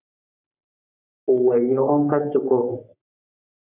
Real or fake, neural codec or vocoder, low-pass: fake; codec, 44.1 kHz, 3.4 kbps, Pupu-Codec; 3.6 kHz